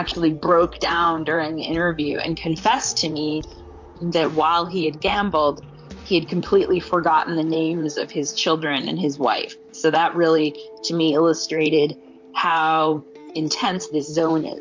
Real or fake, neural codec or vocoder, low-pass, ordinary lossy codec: fake; vocoder, 44.1 kHz, 128 mel bands, Pupu-Vocoder; 7.2 kHz; MP3, 48 kbps